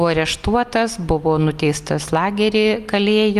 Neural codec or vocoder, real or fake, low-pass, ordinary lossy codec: none; real; 14.4 kHz; Opus, 32 kbps